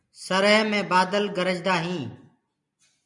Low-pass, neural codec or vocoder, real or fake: 10.8 kHz; none; real